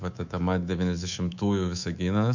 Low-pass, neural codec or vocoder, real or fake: 7.2 kHz; codec, 24 kHz, 3.1 kbps, DualCodec; fake